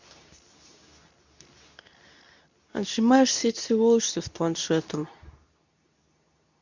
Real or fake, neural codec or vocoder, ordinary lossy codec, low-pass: fake; codec, 24 kHz, 0.9 kbps, WavTokenizer, medium speech release version 2; none; 7.2 kHz